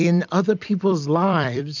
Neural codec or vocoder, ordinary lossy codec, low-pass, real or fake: vocoder, 44.1 kHz, 128 mel bands every 256 samples, BigVGAN v2; MP3, 64 kbps; 7.2 kHz; fake